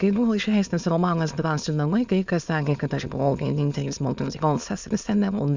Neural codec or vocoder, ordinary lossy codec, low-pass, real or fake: autoencoder, 22.05 kHz, a latent of 192 numbers a frame, VITS, trained on many speakers; Opus, 64 kbps; 7.2 kHz; fake